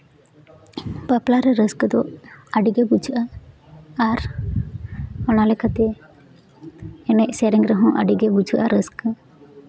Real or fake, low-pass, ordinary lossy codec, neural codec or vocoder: real; none; none; none